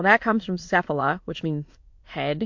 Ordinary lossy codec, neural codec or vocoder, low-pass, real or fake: MP3, 48 kbps; autoencoder, 22.05 kHz, a latent of 192 numbers a frame, VITS, trained on many speakers; 7.2 kHz; fake